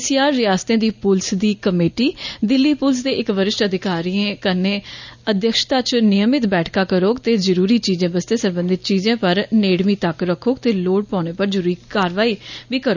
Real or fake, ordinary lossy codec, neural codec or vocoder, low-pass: real; none; none; 7.2 kHz